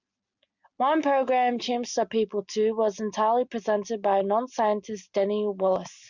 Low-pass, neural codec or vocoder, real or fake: 7.2 kHz; none; real